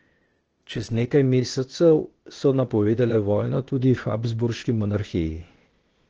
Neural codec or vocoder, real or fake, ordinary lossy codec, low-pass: codec, 16 kHz, 0.8 kbps, ZipCodec; fake; Opus, 16 kbps; 7.2 kHz